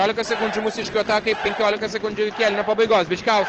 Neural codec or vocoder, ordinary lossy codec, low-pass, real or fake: none; Opus, 16 kbps; 7.2 kHz; real